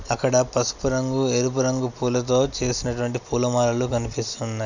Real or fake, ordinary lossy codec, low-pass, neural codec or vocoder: real; none; 7.2 kHz; none